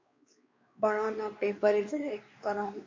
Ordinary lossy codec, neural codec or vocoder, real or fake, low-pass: MP3, 48 kbps; codec, 16 kHz, 2 kbps, X-Codec, WavLM features, trained on Multilingual LibriSpeech; fake; 7.2 kHz